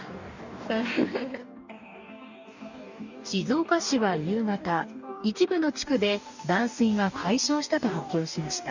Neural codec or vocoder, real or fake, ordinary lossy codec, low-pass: codec, 44.1 kHz, 2.6 kbps, DAC; fake; none; 7.2 kHz